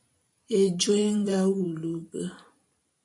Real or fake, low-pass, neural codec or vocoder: fake; 10.8 kHz; vocoder, 44.1 kHz, 128 mel bands every 512 samples, BigVGAN v2